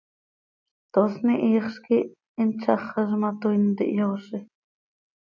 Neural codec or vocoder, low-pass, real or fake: none; 7.2 kHz; real